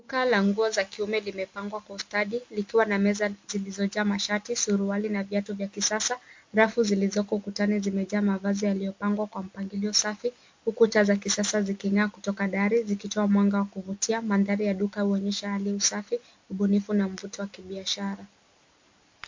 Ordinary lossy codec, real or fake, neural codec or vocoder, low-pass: MP3, 48 kbps; real; none; 7.2 kHz